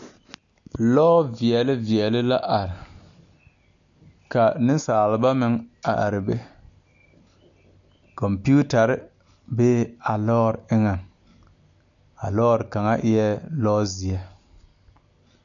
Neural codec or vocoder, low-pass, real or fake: none; 7.2 kHz; real